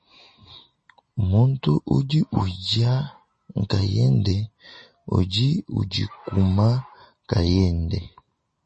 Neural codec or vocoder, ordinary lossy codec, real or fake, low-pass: none; MP3, 32 kbps; real; 10.8 kHz